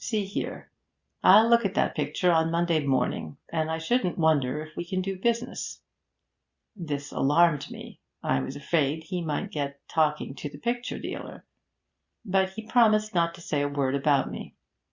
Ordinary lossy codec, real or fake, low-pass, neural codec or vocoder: Opus, 64 kbps; real; 7.2 kHz; none